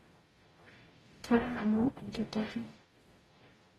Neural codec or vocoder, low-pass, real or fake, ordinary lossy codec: codec, 44.1 kHz, 0.9 kbps, DAC; 19.8 kHz; fake; AAC, 32 kbps